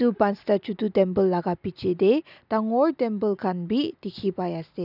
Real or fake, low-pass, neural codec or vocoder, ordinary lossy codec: real; 5.4 kHz; none; none